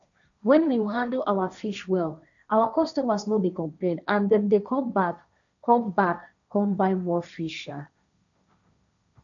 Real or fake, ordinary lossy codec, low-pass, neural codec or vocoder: fake; none; 7.2 kHz; codec, 16 kHz, 1.1 kbps, Voila-Tokenizer